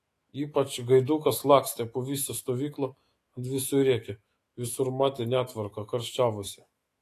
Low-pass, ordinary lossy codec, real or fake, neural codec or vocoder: 14.4 kHz; AAC, 48 kbps; fake; autoencoder, 48 kHz, 128 numbers a frame, DAC-VAE, trained on Japanese speech